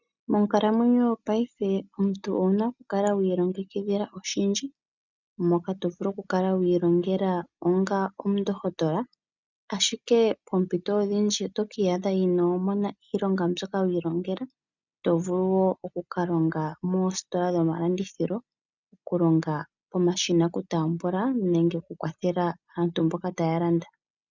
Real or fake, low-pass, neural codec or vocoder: real; 7.2 kHz; none